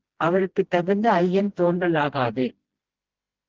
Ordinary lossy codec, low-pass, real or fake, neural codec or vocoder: Opus, 16 kbps; 7.2 kHz; fake; codec, 16 kHz, 1 kbps, FreqCodec, smaller model